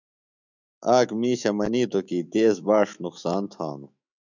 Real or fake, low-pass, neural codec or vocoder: fake; 7.2 kHz; autoencoder, 48 kHz, 128 numbers a frame, DAC-VAE, trained on Japanese speech